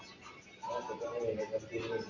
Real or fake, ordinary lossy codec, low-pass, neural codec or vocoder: real; AAC, 48 kbps; 7.2 kHz; none